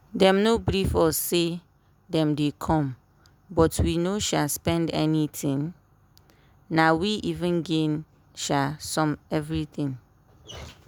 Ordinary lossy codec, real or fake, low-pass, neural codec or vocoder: none; real; none; none